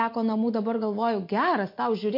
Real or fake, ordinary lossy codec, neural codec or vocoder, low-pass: real; MP3, 32 kbps; none; 5.4 kHz